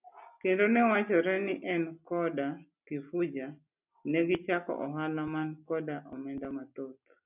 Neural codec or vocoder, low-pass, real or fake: none; 3.6 kHz; real